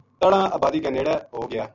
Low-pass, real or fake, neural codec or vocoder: 7.2 kHz; real; none